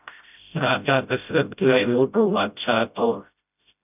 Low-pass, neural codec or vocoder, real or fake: 3.6 kHz; codec, 16 kHz, 0.5 kbps, FreqCodec, smaller model; fake